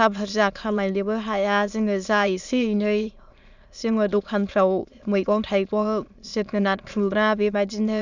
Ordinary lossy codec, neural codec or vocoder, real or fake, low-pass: none; autoencoder, 22.05 kHz, a latent of 192 numbers a frame, VITS, trained on many speakers; fake; 7.2 kHz